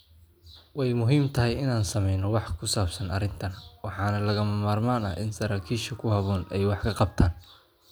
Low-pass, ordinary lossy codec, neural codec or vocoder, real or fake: none; none; none; real